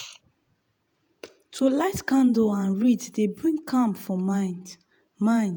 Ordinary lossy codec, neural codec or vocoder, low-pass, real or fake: none; vocoder, 48 kHz, 128 mel bands, Vocos; none; fake